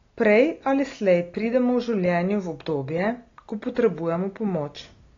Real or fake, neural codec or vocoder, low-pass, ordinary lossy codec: real; none; 7.2 kHz; AAC, 32 kbps